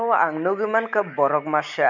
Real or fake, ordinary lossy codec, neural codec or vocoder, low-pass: real; none; none; 7.2 kHz